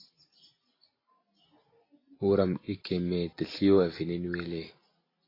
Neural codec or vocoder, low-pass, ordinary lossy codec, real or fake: none; 5.4 kHz; AAC, 24 kbps; real